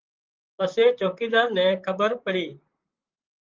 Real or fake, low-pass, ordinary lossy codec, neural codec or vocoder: fake; 7.2 kHz; Opus, 32 kbps; vocoder, 44.1 kHz, 128 mel bands, Pupu-Vocoder